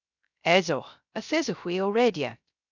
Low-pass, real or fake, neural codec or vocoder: 7.2 kHz; fake; codec, 16 kHz, 0.3 kbps, FocalCodec